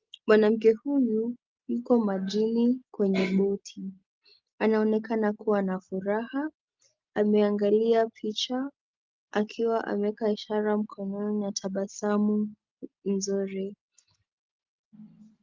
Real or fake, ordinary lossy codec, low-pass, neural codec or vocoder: real; Opus, 32 kbps; 7.2 kHz; none